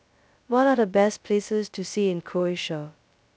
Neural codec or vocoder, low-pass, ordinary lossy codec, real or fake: codec, 16 kHz, 0.2 kbps, FocalCodec; none; none; fake